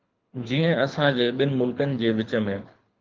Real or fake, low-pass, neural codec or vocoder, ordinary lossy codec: fake; 7.2 kHz; codec, 24 kHz, 6 kbps, HILCodec; Opus, 24 kbps